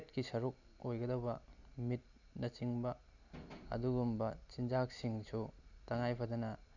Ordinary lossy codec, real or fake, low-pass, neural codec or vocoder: none; real; 7.2 kHz; none